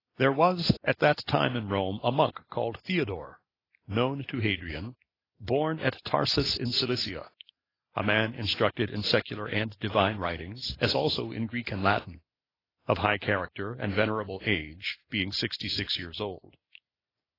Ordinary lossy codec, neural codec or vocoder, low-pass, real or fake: AAC, 24 kbps; none; 5.4 kHz; real